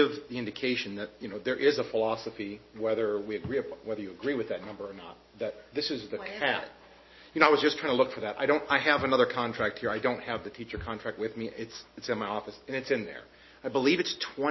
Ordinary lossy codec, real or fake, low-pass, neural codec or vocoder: MP3, 24 kbps; real; 7.2 kHz; none